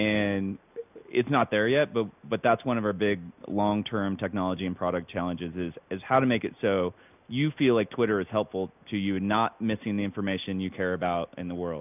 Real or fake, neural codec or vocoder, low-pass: real; none; 3.6 kHz